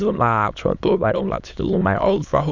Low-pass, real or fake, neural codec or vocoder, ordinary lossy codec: 7.2 kHz; fake; autoencoder, 22.05 kHz, a latent of 192 numbers a frame, VITS, trained on many speakers; Opus, 64 kbps